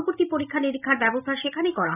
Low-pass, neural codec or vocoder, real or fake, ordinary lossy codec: 3.6 kHz; none; real; none